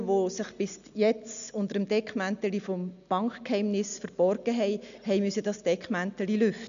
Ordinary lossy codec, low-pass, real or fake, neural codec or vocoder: none; 7.2 kHz; real; none